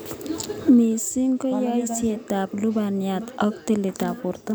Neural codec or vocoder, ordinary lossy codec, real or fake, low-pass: none; none; real; none